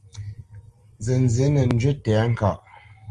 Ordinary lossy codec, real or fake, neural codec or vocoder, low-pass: Opus, 24 kbps; real; none; 10.8 kHz